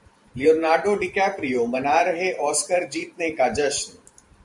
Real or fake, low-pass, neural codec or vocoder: fake; 10.8 kHz; vocoder, 44.1 kHz, 128 mel bands every 512 samples, BigVGAN v2